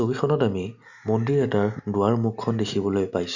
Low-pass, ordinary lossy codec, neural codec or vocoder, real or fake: 7.2 kHz; none; none; real